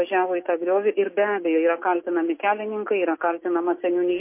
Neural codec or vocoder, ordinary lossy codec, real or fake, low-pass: none; MP3, 24 kbps; real; 3.6 kHz